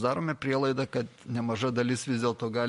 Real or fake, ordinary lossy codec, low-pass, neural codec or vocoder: real; MP3, 48 kbps; 14.4 kHz; none